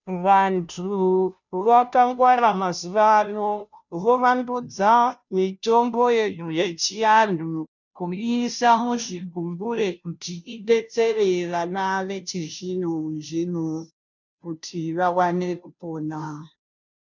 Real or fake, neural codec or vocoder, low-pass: fake; codec, 16 kHz, 0.5 kbps, FunCodec, trained on Chinese and English, 25 frames a second; 7.2 kHz